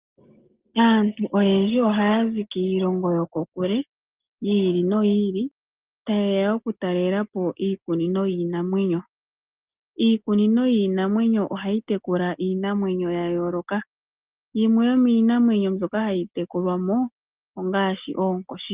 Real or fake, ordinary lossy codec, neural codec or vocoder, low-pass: real; Opus, 32 kbps; none; 3.6 kHz